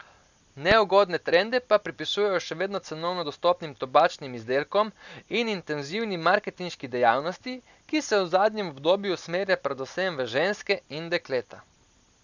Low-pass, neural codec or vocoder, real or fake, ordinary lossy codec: 7.2 kHz; none; real; none